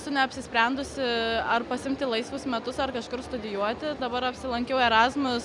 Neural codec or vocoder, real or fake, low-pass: none; real; 10.8 kHz